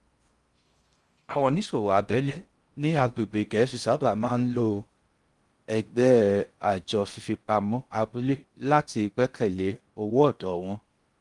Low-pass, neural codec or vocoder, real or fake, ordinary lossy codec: 10.8 kHz; codec, 16 kHz in and 24 kHz out, 0.6 kbps, FocalCodec, streaming, 4096 codes; fake; Opus, 32 kbps